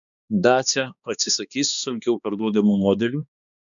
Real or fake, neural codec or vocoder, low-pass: fake; codec, 16 kHz, 2 kbps, X-Codec, HuBERT features, trained on balanced general audio; 7.2 kHz